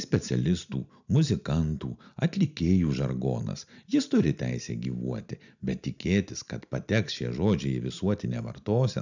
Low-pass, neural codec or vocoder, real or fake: 7.2 kHz; none; real